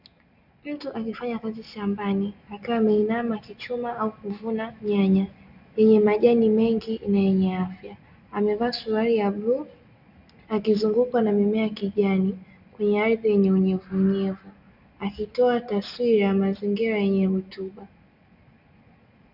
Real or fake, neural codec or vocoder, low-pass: real; none; 5.4 kHz